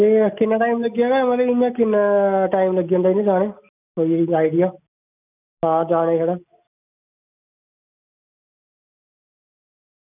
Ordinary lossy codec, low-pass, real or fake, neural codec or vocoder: none; 3.6 kHz; real; none